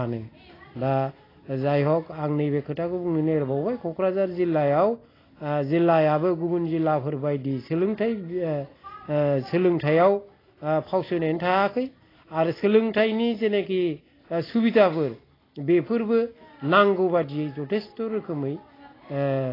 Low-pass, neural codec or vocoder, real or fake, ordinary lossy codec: 5.4 kHz; none; real; AAC, 24 kbps